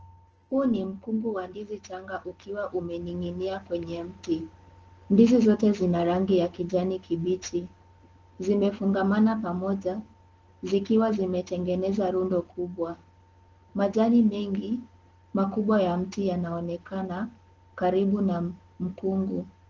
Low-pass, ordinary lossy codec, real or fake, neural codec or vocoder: 7.2 kHz; Opus, 16 kbps; real; none